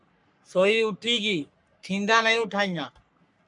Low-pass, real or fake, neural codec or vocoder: 10.8 kHz; fake; codec, 44.1 kHz, 3.4 kbps, Pupu-Codec